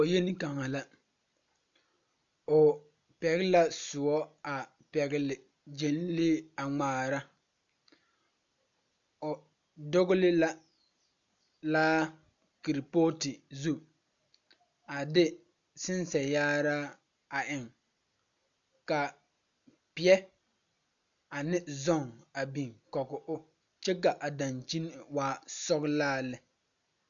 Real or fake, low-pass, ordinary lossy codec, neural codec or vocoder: real; 7.2 kHz; MP3, 96 kbps; none